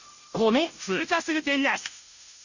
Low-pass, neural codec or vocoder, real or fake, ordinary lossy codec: 7.2 kHz; codec, 16 kHz, 0.5 kbps, FunCodec, trained on Chinese and English, 25 frames a second; fake; none